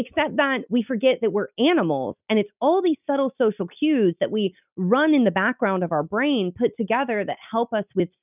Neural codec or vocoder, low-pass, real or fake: none; 3.6 kHz; real